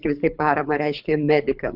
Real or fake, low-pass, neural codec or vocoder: fake; 5.4 kHz; codec, 24 kHz, 6 kbps, HILCodec